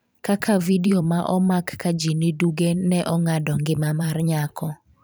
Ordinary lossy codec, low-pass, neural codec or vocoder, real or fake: none; none; vocoder, 44.1 kHz, 128 mel bands every 512 samples, BigVGAN v2; fake